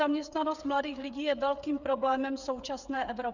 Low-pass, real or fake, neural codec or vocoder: 7.2 kHz; fake; codec, 16 kHz, 8 kbps, FreqCodec, smaller model